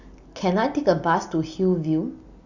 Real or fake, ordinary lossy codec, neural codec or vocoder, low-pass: real; Opus, 64 kbps; none; 7.2 kHz